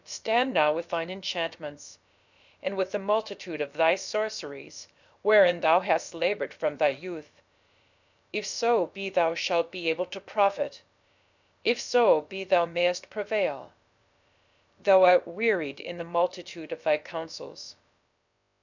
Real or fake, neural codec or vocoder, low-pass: fake; codec, 16 kHz, about 1 kbps, DyCAST, with the encoder's durations; 7.2 kHz